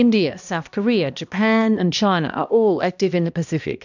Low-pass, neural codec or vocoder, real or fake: 7.2 kHz; codec, 16 kHz, 1 kbps, X-Codec, HuBERT features, trained on balanced general audio; fake